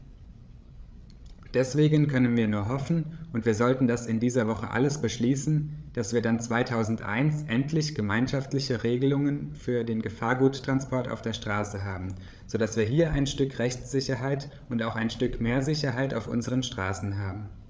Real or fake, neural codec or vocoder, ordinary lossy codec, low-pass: fake; codec, 16 kHz, 8 kbps, FreqCodec, larger model; none; none